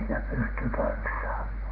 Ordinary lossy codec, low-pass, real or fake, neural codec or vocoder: Opus, 16 kbps; 5.4 kHz; real; none